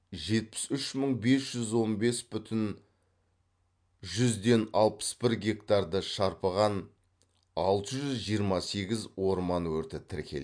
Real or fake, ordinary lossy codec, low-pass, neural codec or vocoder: real; MP3, 64 kbps; 9.9 kHz; none